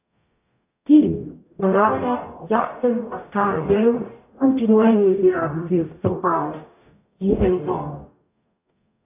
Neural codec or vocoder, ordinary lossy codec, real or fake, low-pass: codec, 44.1 kHz, 0.9 kbps, DAC; AAC, 32 kbps; fake; 3.6 kHz